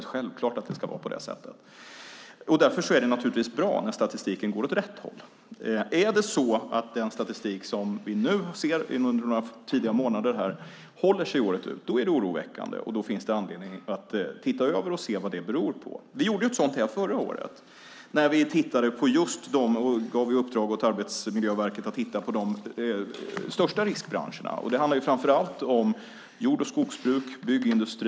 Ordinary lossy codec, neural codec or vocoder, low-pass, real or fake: none; none; none; real